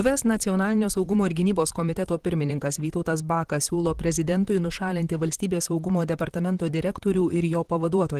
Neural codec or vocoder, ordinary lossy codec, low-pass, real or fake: vocoder, 44.1 kHz, 128 mel bands, Pupu-Vocoder; Opus, 16 kbps; 14.4 kHz; fake